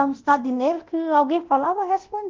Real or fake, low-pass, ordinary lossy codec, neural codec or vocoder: fake; 7.2 kHz; Opus, 16 kbps; codec, 24 kHz, 0.9 kbps, DualCodec